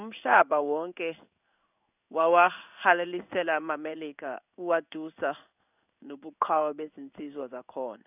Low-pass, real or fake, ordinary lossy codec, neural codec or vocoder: 3.6 kHz; fake; none; codec, 16 kHz in and 24 kHz out, 1 kbps, XY-Tokenizer